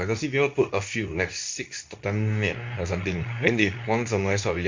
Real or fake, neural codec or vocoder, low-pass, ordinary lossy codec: fake; codec, 24 kHz, 0.9 kbps, WavTokenizer, medium speech release version 2; 7.2 kHz; MP3, 64 kbps